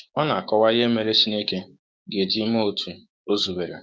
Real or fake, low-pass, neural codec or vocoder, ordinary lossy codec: fake; none; codec, 16 kHz, 6 kbps, DAC; none